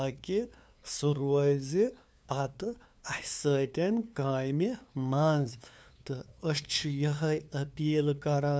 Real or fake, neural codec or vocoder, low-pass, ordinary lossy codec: fake; codec, 16 kHz, 2 kbps, FunCodec, trained on LibriTTS, 25 frames a second; none; none